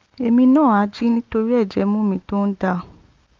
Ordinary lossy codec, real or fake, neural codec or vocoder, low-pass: Opus, 32 kbps; real; none; 7.2 kHz